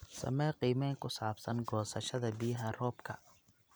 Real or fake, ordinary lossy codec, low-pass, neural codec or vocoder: real; none; none; none